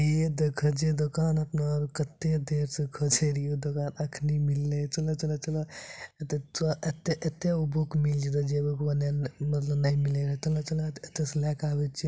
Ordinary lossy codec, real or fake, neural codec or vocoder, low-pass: none; real; none; none